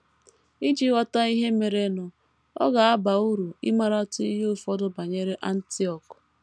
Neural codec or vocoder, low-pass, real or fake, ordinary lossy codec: none; none; real; none